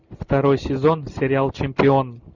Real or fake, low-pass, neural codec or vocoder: real; 7.2 kHz; none